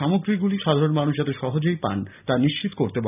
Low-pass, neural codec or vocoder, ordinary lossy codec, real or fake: 3.6 kHz; none; none; real